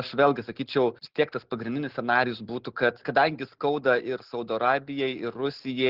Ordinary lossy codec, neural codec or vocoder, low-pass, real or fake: Opus, 16 kbps; none; 5.4 kHz; real